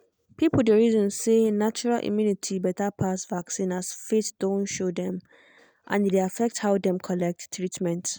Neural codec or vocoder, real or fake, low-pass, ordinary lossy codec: none; real; none; none